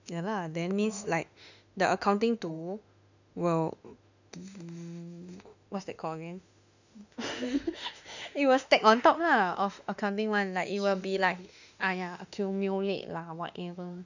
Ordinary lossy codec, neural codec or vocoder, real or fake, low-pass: none; autoencoder, 48 kHz, 32 numbers a frame, DAC-VAE, trained on Japanese speech; fake; 7.2 kHz